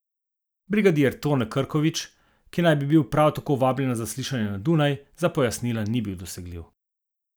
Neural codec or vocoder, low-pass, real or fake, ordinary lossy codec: none; none; real; none